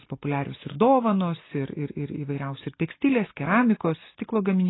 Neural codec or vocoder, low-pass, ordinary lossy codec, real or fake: none; 7.2 kHz; AAC, 16 kbps; real